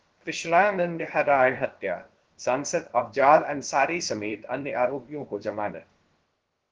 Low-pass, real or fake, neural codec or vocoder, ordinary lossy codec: 7.2 kHz; fake; codec, 16 kHz, about 1 kbps, DyCAST, with the encoder's durations; Opus, 16 kbps